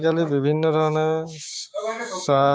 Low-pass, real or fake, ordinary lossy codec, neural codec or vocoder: none; fake; none; codec, 16 kHz, 6 kbps, DAC